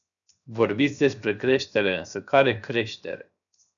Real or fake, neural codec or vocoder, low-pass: fake; codec, 16 kHz, 0.7 kbps, FocalCodec; 7.2 kHz